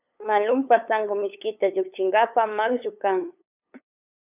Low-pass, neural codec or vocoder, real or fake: 3.6 kHz; codec, 16 kHz, 8 kbps, FunCodec, trained on LibriTTS, 25 frames a second; fake